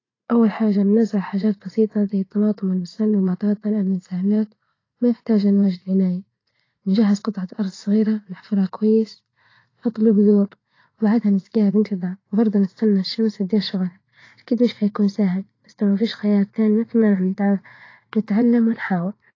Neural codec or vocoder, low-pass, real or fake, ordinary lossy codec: vocoder, 44.1 kHz, 80 mel bands, Vocos; 7.2 kHz; fake; AAC, 32 kbps